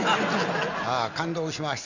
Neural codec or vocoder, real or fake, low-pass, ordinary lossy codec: none; real; 7.2 kHz; none